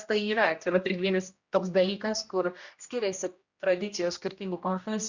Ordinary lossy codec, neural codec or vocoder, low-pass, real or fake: Opus, 64 kbps; codec, 16 kHz, 1 kbps, X-Codec, HuBERT features, trained on general audio; 7.2 kHz; fake